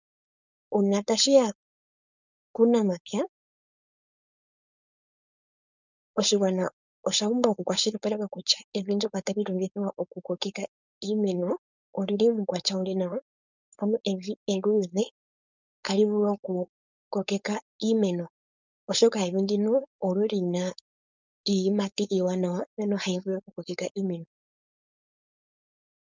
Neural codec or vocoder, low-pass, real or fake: codec, 16 kHz, 4.8 kbps, FACodec; 7.2 kHz; fake